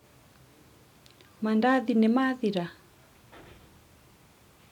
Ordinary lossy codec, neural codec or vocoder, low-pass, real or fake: none; none; 19.8 kHz; real